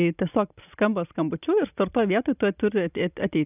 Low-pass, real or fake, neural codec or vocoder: 3.6 kHz; real; none